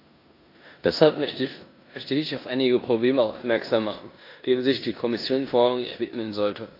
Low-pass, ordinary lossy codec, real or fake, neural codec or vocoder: 5.4 kHz; AAC, 32 kbps; fake; codec, 16 kHz in and 24 kHz out, 0.9 kbps, LongCat-Audio-Codec, four codebook decoder